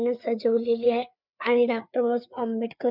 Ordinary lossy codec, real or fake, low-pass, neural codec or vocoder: none; fake; 5.4 kHz; codec, 16 kHz, 4 kbps, FreqCodec, larger model